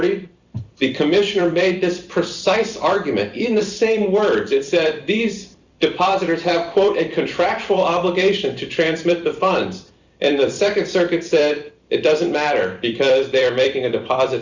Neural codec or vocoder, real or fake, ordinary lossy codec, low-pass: vocoder, 44.1 kHz, 128 mel bands every 256 samples, BigVGAN v2; fake; Opus, 64 kbps; 7.2 kHz